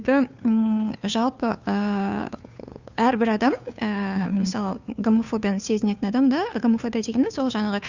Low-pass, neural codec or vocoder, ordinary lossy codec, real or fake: 7.2 kHz; codec, 16 kHz, 2 kbps, FunCodec, trained on Chinese and English, 25 frames a second; Opus, 64 kbps; fake